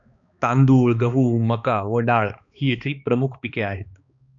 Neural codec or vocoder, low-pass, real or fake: codec, 16 kHz, 2 kbps, X-Codec, HuBERT features, trained on balanced general audio; 7.2 kHz; fake